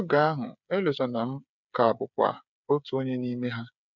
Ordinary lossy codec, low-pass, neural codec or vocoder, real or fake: none; 7.2 kHz; codec, 16 kHz, 8 kbps, FreqCodec, smaller model; fake